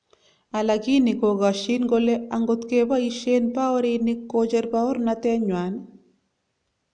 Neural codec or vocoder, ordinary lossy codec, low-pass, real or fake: none; none; 9.9 kHz; real